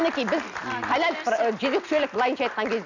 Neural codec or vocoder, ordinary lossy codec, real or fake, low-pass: none; none; real; 7.2 kHz